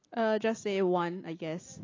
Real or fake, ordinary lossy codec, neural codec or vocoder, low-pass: real; AAC, 32 kbps; none; 7.2 kHz